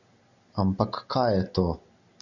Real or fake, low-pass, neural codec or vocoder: real; 7.2 kHz; none